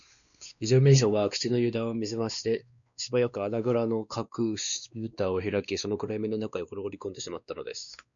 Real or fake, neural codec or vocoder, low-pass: fake; codec, 16 kHz, 2 kbps, X-Codec, WavLM features, trained on Multilingual LibriSpeech; 7.2 kHz